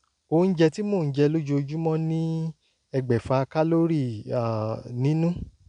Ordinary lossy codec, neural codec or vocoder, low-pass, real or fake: none; none; 9.9 kHz; real